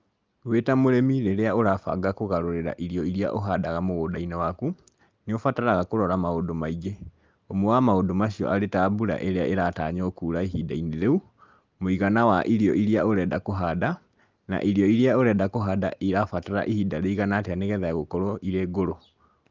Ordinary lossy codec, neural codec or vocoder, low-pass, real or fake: Opus, 32 kbps; none; 7.2 kHz; real